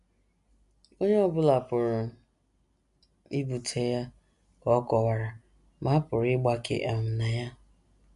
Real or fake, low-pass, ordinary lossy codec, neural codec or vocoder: real; 10.8 kHz; none; none